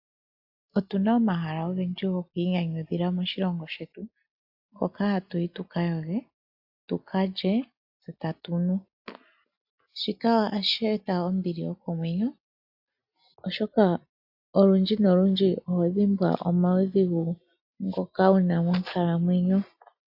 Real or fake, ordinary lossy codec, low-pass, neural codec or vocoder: real; AAC, 48 kbps; 5.4 kHz; none